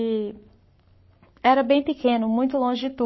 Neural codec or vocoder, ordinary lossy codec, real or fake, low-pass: codec, 44.1 kHz, 7.8 kbps, Pupu-Codec; MP3, 24 kbps; fake; 7.2 kHz